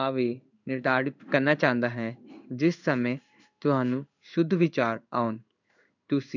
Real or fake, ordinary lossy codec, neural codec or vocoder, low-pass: fake; none; codec, 16 kHz in and 24 kHz out, 1 kbps, XY-Tokenizer; 7.2 kHz